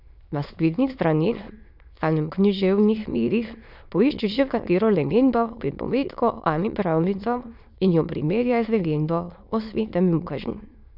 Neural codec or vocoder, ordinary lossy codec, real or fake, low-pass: autoencoder, 22.05 kHz, a latent of 192 numbers a frame, VITS, trained on many speakers; none; fake; 5.4 kHz